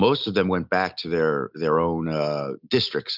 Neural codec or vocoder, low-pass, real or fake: none; 5.4 kHz; real